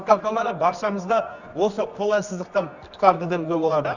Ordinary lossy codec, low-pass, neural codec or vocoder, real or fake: none; 7.2 kHz; codec, 24 kHz, 0.9 kbps, WavTokenizer, medium music audio release; fake